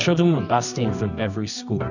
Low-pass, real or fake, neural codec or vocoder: 7.2 kHz; fake; codec, 24 kHz, 0.9 kbps, WavTokenizer, medium music audio release